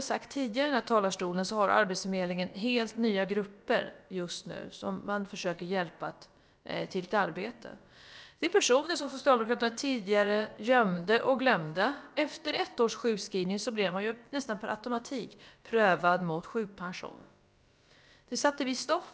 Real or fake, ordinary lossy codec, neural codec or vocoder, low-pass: fake; none; codec, 16 kHz, about 1 kbps, DyCAST, with the encoder's durations; none